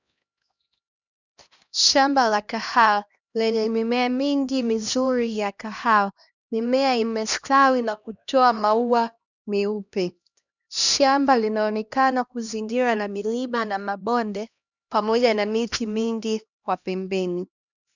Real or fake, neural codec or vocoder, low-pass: fake; codec, 16 kHz, 1 kbps, X-Codec, HuBERT features, trained on LibriSpeech; 7.2 kHz